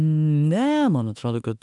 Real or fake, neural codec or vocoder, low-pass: fake; autoencoder, 48 kHz, 32 numbers a frame, DAC-VAE, trained on Japanese speech; 10.8 kHz